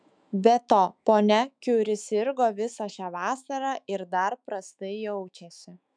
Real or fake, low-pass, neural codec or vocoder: fake; 9.9 kHz; autoencoder, 48 kHz, 128 numbers a frame, DAC-VAE, trained on Japanese speech